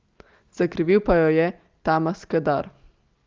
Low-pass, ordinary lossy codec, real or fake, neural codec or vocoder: 7.2 kHz; Opus, 32 kbps; real; none